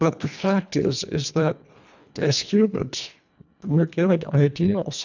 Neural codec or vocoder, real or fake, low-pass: codec, 24 kHz, 1.5 kbps, HILCodec; fake; 7.2 kHz